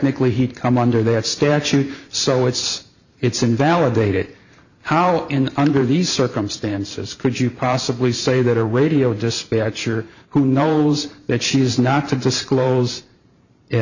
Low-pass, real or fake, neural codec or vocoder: 7.2 kHz; fake; vocoder, 44.1 kHz, 128 mel bands every 512 samples, BigVGAN v2